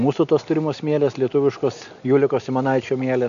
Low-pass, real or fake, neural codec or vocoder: 7.2 kHz; real; none